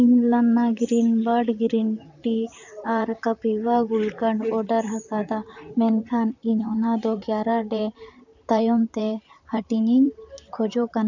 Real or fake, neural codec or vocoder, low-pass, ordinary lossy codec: fake; vocoder, 44.1 kHz, 128 mel bands, Pupu-Vocoder; 7.2 kHz; none